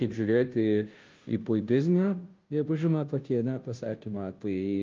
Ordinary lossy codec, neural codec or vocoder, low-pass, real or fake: Opus, 24 kbps; codec, 16 kHz, 0.5 kbps, FunCodec, trained on Chinese and English, 25 frames a second; 7.2 kHz; fake